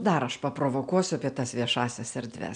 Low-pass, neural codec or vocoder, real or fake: 9.9 kHz; none; real